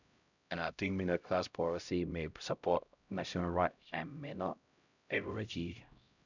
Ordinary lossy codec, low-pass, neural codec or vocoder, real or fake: none; 7.2 kHz; codec, 16 kHz, 0.5 kbps, X-Codec, HuBERT features, trained on LibriSpeech; fake